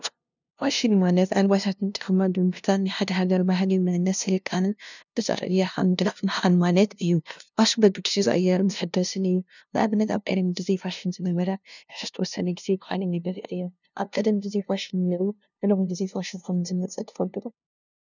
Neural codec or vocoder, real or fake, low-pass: codec, 16 kHz, 0.5 kbps, FunCodec, trained on LibriTTS, 25 frames a second; fake; 7.2 kHz